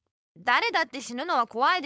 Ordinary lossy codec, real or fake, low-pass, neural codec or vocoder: none; fake; none; codec, 16 kHz, 4.8 kbps, FACodec